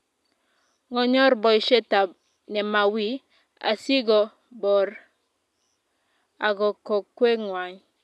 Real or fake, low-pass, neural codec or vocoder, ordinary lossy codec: real; none; none; none